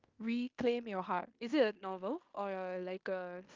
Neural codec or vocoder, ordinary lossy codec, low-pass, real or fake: codec, 16 kHz in and 24 kHz out, 0.9 kbps, LongCat-Audio-Codec, fine tuned four codebook decoder; Opus, 32 kbps; 7.2 kHz; fake